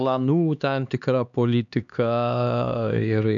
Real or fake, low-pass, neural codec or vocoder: fake; 7.2 kHz; codec, 16 kHz, 2 kbps, X-Codec, HuBERT features, trained on LibriSpeech